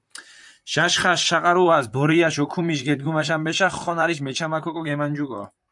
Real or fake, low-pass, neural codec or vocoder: fake; 10.8 kHz; vocoder, 44.1 kHz, 128 mel bands, Pupu-Vocoder